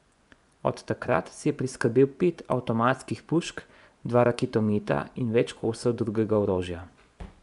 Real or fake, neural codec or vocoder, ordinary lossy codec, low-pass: fake; vocoder, 24 kHz, 100 mel bands, Vocos; none; 10.8 kHz